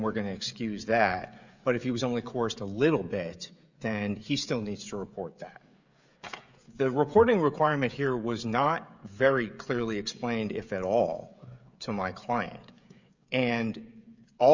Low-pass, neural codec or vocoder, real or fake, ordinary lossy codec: 7.2 kHz; codec, 16 kHz, 16 kbps, FreqCodec, smaller model; fake; Opus, 64 kbps